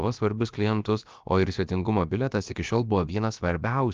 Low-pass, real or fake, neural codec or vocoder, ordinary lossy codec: 7.2 kHz; fake; codec, 16 kHz, about 1 kbps, DyCAST, with the encoder's durations; Opus, 24 kbps